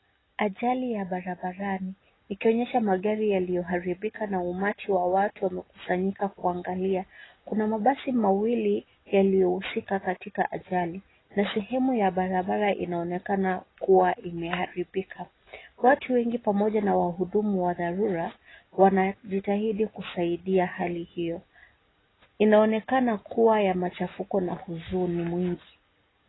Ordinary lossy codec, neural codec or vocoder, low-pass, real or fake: AAC, 16 kbps; none; 7.2 kHz; real